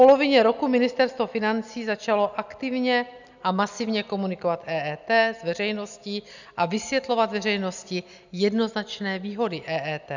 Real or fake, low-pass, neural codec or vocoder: real; 7.2 kHz; none